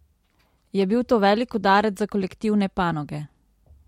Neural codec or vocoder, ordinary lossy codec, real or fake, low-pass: none; MP3, 64 kbps; real; 19.8 kHz